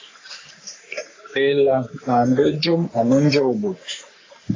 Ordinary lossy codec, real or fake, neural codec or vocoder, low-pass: AAC, 32 kbps; fake; codec, 44.1 kHz, 3.4 kbps, Pupu-Codec; 7.2 kHz